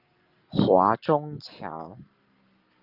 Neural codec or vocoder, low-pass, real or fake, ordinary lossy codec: none; 5.4 kHz; real; Opus, 32 kbps